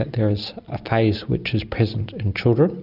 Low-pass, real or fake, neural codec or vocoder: 5.4 kHz; real; none